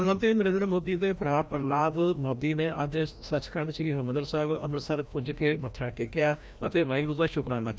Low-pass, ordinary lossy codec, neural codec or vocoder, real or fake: none; none; codec, 16 kHz, 1 kbps, FreqCodec, larger model; fake